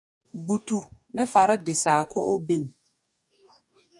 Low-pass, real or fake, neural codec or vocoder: 10.8 kHz; fake; codec, 44.1 kHz, 2.6 kbps, DAC